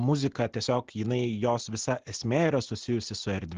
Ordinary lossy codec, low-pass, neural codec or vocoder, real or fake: Opus, 16 kbps; 7.2 kHz; none; real